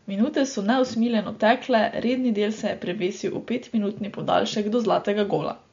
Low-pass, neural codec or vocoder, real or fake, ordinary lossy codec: 7.2 kHz; none; real; MP3, 48 kbps